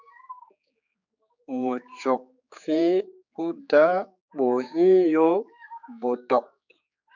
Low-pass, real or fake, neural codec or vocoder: 7.2 kHz; fake; codec, 16 kHz, 4 kbps, X-Codec, HuBERT features, trained on general audio